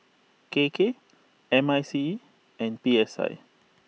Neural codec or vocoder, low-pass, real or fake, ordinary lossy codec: none; none; real; none